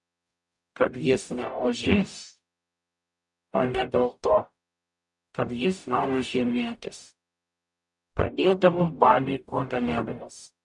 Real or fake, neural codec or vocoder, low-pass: fake; codec, 44.1 kHz, 0.9 kbps, DAC; 10.8 kHz